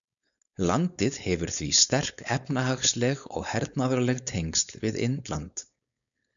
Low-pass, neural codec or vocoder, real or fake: 7.2 kHz; codec, 16 kHz, 4.8 kbps, FACodec; fake